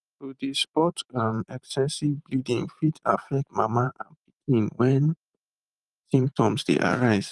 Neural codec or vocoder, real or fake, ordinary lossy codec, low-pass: vocoder, 44.1 kHz, 128 mel bands every 512 samples, BigVGAN v2; fake; Opus, 32 kbps; 10.8 kHz